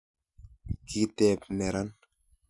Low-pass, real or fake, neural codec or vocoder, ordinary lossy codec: 10.8 kHz; real; none; none